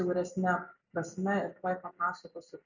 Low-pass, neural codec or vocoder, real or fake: 7.2 kHz; none; real